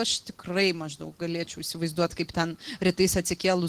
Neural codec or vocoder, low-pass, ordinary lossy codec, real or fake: none; 14.4 kHz; Opus, 16 kbps; real